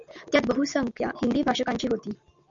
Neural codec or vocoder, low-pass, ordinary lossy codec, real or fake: none; 7.2 kHz; MP3, 96 kbps; real